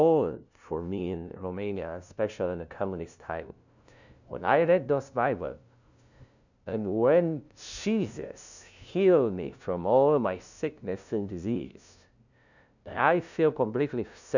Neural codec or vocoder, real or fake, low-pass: codec, 16 kHz, 0.5 kbps, FunCodec, trained on LibriTTS, 25 frames a second; fake; 7.2 kHz